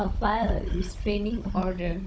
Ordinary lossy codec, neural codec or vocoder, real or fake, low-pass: none; codec, 16 kHz, 16 kbps, FunCodec, trained on Chinese and English, 50 frames a second; fake; none